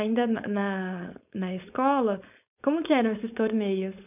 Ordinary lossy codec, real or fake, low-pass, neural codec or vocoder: none; fake; 3.6 kHz; codec, 16 kHz, 4.8 kbps, FACodec